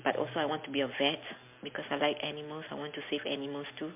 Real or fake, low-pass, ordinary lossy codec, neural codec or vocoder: real; 3.6 kHz; MP3, 32 kbps; none